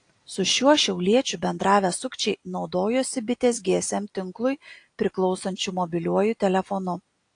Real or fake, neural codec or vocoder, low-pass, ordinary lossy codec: real; none; 9.9 kHz; AAC, 48 kbps